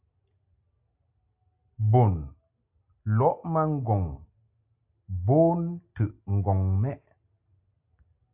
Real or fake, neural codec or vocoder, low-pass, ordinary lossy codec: real; none; 3.6 kHz; AAC, 32 kbps